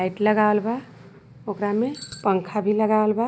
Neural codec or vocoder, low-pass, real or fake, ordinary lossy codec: none; none; real; none